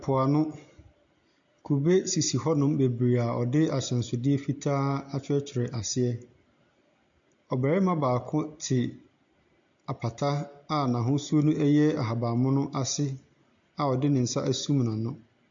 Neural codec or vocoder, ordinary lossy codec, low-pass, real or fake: none; AAC, 64 kbps; 7.2 kHz; real